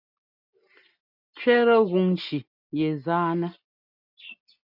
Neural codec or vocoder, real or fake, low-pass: none; real; 5.4 kHz